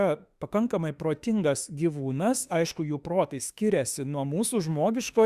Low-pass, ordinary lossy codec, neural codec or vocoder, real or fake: 14.4 kHz; Opus, 64 kbps; autoencoder, 48 kHz, 32 numbers a frame, DAC-VAE, trained on Japanese speech; fake